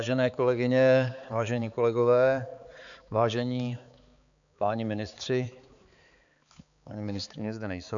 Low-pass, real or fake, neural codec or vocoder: 7.2 kHz; fake; codec, 16 kHz, 4 kbps, X-Codec, HuBERT features, trained on balanced general audio